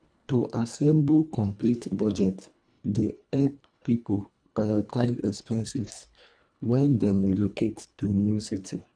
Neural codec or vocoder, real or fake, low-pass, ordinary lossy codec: codec, 24 kHz, 1.5 kbps, HILCodec; fake; 9.9 kHz; none